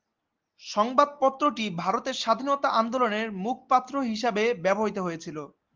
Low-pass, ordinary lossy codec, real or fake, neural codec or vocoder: 7.2 kHz; Opus, 32 kbps; real; none